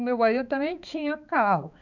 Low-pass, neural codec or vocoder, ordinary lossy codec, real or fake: 7.2 kHz; codec, 16 kHz, 4 kbps, X-Codec, HuBERT features, trained on balanced general audio; none; fake